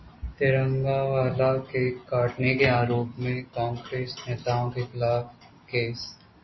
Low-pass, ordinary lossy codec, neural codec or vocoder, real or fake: 7.2 kHz; MP3, 24 kbps; none; real